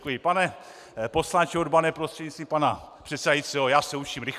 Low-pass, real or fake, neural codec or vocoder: 14.4 kHz; real; none